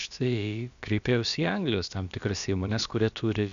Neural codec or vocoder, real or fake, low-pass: codec, 16 kHz, about 1 kbps, DyCAST, with the encoder's durations; fake; 7.2 kHz